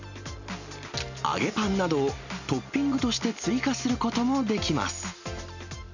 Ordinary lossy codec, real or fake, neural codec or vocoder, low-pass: none; real; none; 7.2 kHz